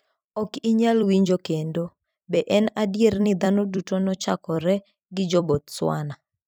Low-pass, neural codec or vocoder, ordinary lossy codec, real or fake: none; vocoder, 44.1 kHz, 128 mel bands every 256 samples, BigVGAN v2; none; fake